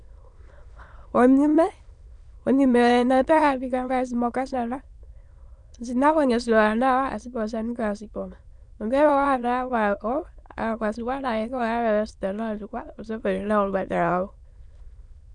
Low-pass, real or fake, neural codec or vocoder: 9.9 kHz; fake; autoencoder, 22.05 kHz, a latent of 192 numbers a frame, VITS, trained on many speakers